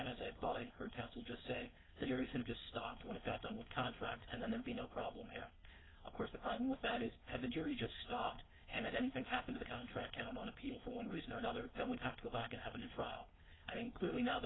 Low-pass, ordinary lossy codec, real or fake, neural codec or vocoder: 7.2 kHz; AAC, 16 kbps; fake; codec, 16 kHz, 4.8 kbps, FACodec